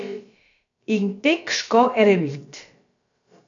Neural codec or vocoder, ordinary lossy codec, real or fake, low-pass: codec, 16 kHz, about 1 kbps, DyCAST, with the encoder's durations; AAC, 64 kbps; fake; 7.2 kHz